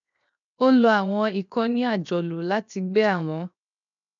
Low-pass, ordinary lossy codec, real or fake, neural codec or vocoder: 7.2 kHz; none; fake; codec, 16 kHz, 0.7 kbps, FocalCodec